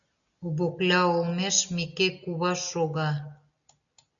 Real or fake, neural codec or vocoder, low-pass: real; none; 7.2 kHz